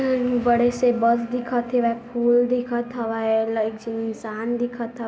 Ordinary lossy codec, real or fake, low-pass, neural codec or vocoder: none; real; none; none